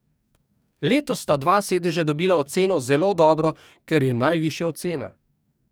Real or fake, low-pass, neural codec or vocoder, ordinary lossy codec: fake; none; codec, 44.1 kHz, 2.6 kbps, DAC; none